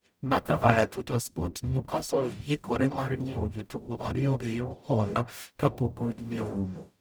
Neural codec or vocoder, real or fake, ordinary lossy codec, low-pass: codec, 44.1 kHz, 0.9 kbps, DAC; fake; none; none